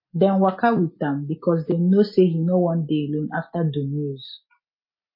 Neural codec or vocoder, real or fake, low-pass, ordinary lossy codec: none; real; 5.4 kHz; MP3, 24 kbps